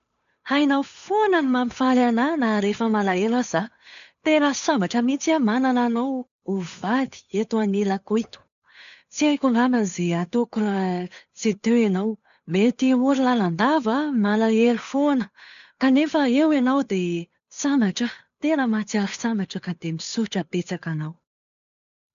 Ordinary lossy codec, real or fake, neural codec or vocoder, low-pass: none; fake; codec, 16 kHz, 8 kbps, FunCodec, trained on Chinese and English, 25 frames a second; 7.2 kHz